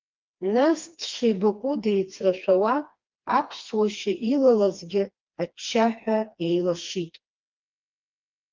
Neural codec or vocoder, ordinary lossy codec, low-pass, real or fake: codec, 16 kHz, 2 kbps, FreqCodec, smaller model; Opus, 24 kbps; 7.2 kHz; fake